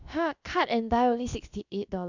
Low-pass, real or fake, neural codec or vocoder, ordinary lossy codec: 7.2 kHz; fake; codec, 16 kHz, about 1 kbps, DyCAST, with the encoder's durations; none